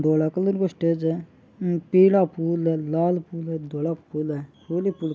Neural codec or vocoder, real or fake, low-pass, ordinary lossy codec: none; real; none; none